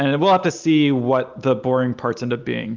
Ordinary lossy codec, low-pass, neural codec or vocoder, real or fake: Opus, 32 kbps; 7.2 kHz; none; real